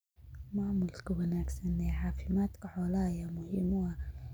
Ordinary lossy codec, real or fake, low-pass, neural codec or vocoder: none; real; none; none